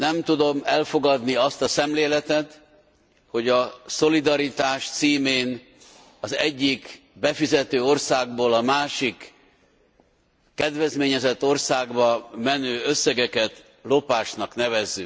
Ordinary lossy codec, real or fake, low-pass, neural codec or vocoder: none; real; none; none